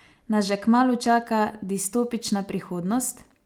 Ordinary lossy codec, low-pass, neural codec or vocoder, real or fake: Opus, 32 kbps; 19.8 kHz; none; real